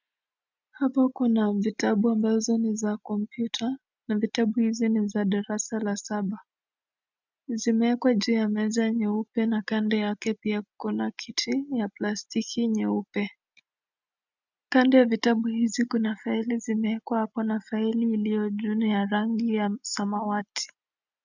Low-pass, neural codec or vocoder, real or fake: 7.2 kHz; none; real